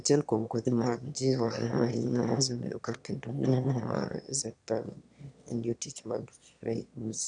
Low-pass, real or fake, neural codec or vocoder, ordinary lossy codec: 9.9 kHz; fake; autoencoder, 22.05 kHz, a latent of 192 numbers a frame, VITS, trained on one speaker; none